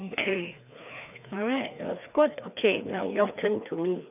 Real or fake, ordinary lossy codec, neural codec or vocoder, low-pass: fake; none; codec, 16 kHz, 2 kbps, FreqCodec, larger model; 3.6 kHz